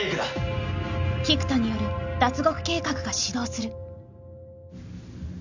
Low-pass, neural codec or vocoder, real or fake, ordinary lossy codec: 7.2 kHz; none; real; none